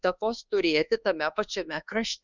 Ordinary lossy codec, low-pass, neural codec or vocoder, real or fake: Opus, 64 kbps; 7.2 kHz; codec, 24 kHz, 1.2 kbps, DualCodec; fake